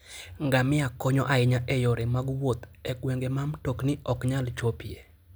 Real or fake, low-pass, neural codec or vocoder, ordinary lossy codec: real; none; none; none